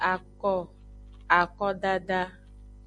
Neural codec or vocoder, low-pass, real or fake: none; 9.9 kHz; real